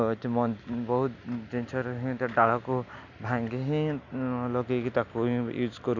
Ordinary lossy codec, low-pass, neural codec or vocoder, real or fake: none; 7.2 kHz; none; real